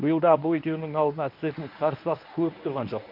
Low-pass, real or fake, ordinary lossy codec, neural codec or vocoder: 5.4 kHz; fake; MP3, 48 kbps; codec, 24 kHz, 0.9 kbps, WavTokenizer, medium speech release version 1